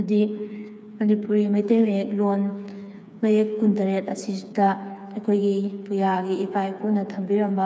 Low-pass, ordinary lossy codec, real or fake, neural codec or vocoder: none; none; fake; codec, 16 kHz, 4 kbps, FreqCodec, smaller model